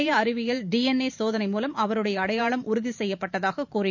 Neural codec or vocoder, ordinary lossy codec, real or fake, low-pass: vocoder, 44.1 kHz, 128 mel bands every 512 samples, BigVGAN v2; none; fake; 7.2 kHz